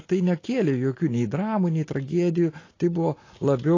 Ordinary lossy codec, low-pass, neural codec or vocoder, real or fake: AAC, 32 kbps; 7.2 kHz; none; real